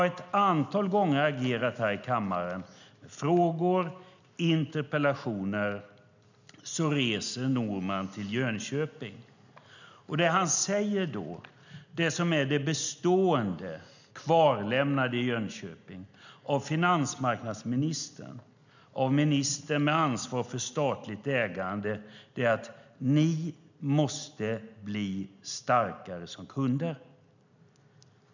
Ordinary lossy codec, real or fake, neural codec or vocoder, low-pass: none; real; none; 7.2 kHz